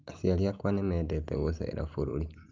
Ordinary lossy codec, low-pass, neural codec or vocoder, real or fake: Opus, 24 kbps; 7.2 kHz; none; real